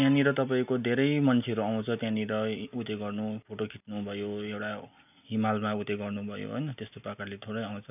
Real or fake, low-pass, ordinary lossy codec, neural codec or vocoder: real; 3.6 kHz; none; none